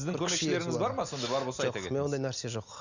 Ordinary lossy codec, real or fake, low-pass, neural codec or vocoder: none; real; 7.2 kHz; none